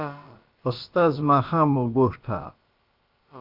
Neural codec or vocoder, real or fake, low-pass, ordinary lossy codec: codec, 16 kHz, about 1 kbps, DyCAST, with the encoder's durations; fake; 5.4 kHz; Opus, 24 kbps